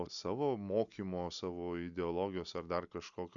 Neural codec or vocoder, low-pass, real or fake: none; 7.2 kHz; real